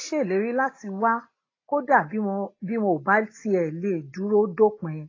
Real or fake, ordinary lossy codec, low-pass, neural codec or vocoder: real; AAC, 32 kbps; 7.2 kHz; none